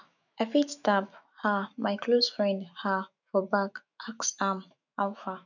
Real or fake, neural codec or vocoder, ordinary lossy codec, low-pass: fake; autoencoder, 48 kHz, 128 numbers a frame, DAC-VAE, trained on Japanese speech; none; 7.2 kHz